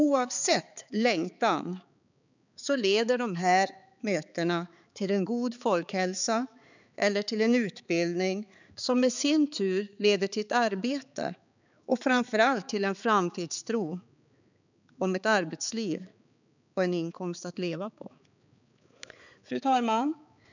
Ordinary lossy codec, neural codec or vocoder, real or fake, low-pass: none; codec, 16 kHz, 4 kbps, X-Codec, HuBERT features, trained on balanced general audio; fake; 7.2 kHz